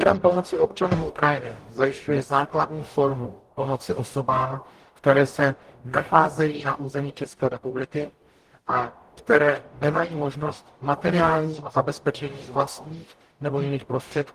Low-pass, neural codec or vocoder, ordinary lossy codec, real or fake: 14.4 kHz; codec, 44.1 kHz, 0.9 kbps, DAC; Opus, 24 kbps; fake